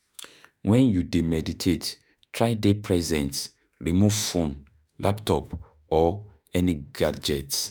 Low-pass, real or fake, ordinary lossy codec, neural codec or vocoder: none; fake; none; autoencoder, 48 kHz, 32 numbers a frame, DAC-VAE, trained on Japanese speech